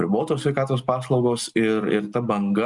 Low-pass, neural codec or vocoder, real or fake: 10.8 kHz; none; real